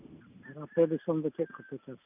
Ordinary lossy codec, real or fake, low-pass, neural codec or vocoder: none; real; 3.6 kHz; none